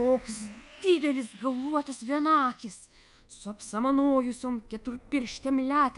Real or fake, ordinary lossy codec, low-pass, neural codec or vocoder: fake; MP3, 96 kbps; 10.8 kHz; codec, 24 kHz, 1.2 kbps, DualCodec